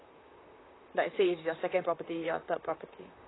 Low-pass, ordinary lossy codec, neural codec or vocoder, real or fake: 7.2 kHz; AAC, 16 kbps; vocoder, 44.1 kHz, 128 mel bands, Pupu-Vocoder; fake